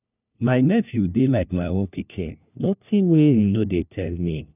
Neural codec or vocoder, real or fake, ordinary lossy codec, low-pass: codec, 16 kHz, 1 kbps, FunCodec, trained on LibriTTS, 50 frames a second; fake; none; 3.6 kHz